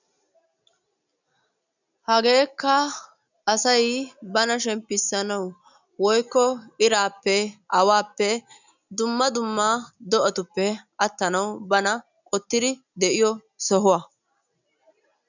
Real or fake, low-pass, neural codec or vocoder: real; 7.2 kHz; none